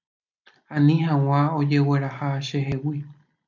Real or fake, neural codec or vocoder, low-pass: real; none; 7.2 kHz